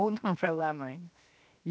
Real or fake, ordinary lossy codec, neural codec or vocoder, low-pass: fake; none; codec, 16 kHz, 0.7 kbps, FocalCodec; none